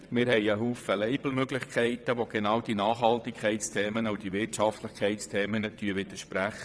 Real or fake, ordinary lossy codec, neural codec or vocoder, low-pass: fake; none; vocoder, 22.05 kHz, 80 mel bands, WaveNeXt; none